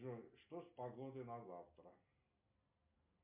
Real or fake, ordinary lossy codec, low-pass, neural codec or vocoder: real; MP3, 32 kbps; 3.6 kHz; none